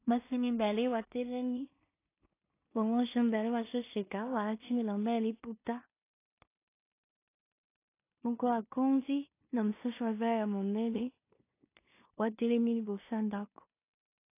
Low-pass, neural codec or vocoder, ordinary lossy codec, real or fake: 3.6 kHz; codec, 16 kHz in and 24 kHz out, 0.4 kbps, LongCat-Audio-Codec, two codebook decoder; AAC, 24 kbps; fake